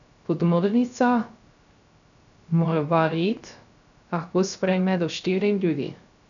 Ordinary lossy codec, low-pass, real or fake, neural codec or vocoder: none; 7.2 kHz; fake; codec, 16 kHz, 0.3 kbps, FocalCodec